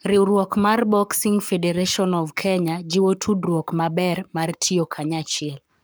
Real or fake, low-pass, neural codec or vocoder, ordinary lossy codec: fake; none; codec, 44.1 kHz, 7.8 kbps, Pupu-Codec; none